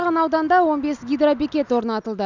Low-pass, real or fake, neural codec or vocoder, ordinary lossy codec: 7.2 kHz; real; none; none